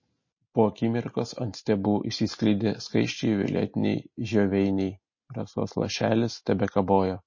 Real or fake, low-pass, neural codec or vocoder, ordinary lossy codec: real; 7.2 kHz; none; MP3, 32 kbps